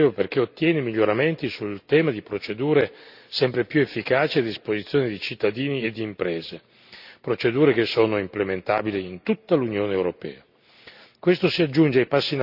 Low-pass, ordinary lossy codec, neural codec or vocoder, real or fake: 5.4 kHz; none; none; real